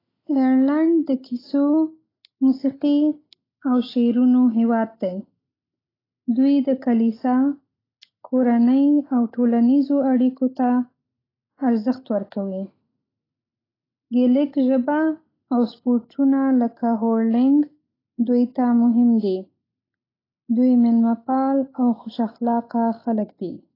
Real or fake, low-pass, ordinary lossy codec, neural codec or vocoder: real; 5.4 kHz; AAC, 24 kbps; none